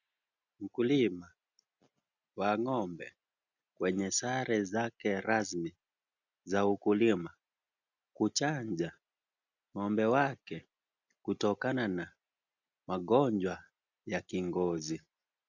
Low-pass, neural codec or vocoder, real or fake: 7.2 kHz; none; real